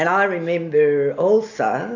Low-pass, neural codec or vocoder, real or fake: 7.2 kHz; none; real